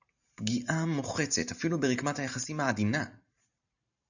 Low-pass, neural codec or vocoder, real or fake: 7.2 kHz; vocoder, 44.1 kHz, 128 mel bands every 512 samples, BigVGAN v2; fake